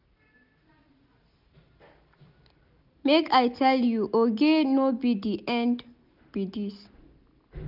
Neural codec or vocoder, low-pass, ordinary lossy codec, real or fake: none; 5.4 kHz; none; real